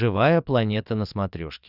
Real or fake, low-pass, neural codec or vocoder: fake; 5.4 kHz; vocoder, 22.05 kHz, 80 mel bands, Vocos